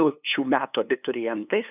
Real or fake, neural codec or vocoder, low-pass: fake; codec, 16 kHz, 2 kbps, FunCodec, trained on LibriTTS, 25 frames a second; 3.6 kHz